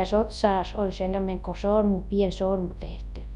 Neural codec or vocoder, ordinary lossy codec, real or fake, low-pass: codec, 24 kHz, 0.9 kbps, WavTokenizer, large speech release; none; fake; 10.8 kHz